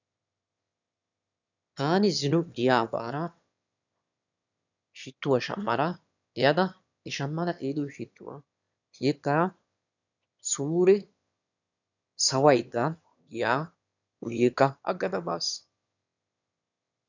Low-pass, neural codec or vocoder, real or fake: 7.2 kHz; autoencoder, 22.05 kHz, a latent of 192 numbers a frame, VITS, trained on one speaker; fake